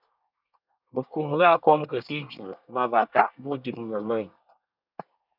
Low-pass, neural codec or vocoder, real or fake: 5.4 kHz; codec, 24 kHz, 1 kbps, SNAC; fake